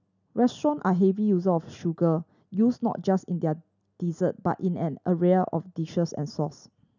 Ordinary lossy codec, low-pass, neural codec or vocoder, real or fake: none; 7.2 kHz; none; real